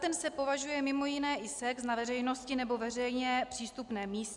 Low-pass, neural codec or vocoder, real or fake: 10.8 kHz; none; real